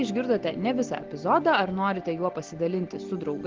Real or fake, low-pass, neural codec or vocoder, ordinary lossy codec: real; 7.2 kHz; none; Opus, 16 kbps